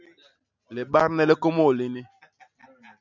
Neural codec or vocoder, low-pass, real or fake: none; 7.2 kHz; real